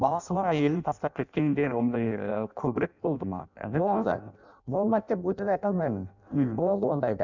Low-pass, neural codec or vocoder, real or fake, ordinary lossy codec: 7.2 kHz; codec, 16 kHz in and 24 kHz out, 0.6 kbps, FireRedTTS-2 codec; fake; none